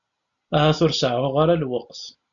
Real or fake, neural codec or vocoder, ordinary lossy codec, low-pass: real; none; MP3, 96 kbps; 7.2 kHz